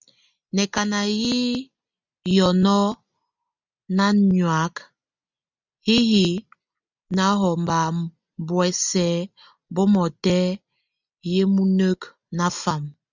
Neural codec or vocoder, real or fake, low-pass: none; real; 7.2 kHz